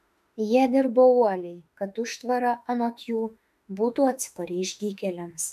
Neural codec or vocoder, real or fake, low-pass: autoencoder, 48 kHz, 32 numbers a frame, DAC-VAE, trained on Japanese speech; fake; 14.4 kHz